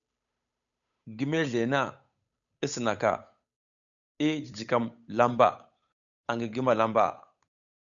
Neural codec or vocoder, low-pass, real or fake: codec, 16 kHz, 8 kbps, FunCodec, trained on Chinese and English, 25 frames a second; 7.2 kHz; fake